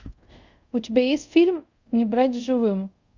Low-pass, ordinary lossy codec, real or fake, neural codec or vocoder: 7.2 kHz; Opus, 64 kbps; fake; codec, 24 kHz, 0.5 kbps, DualCodec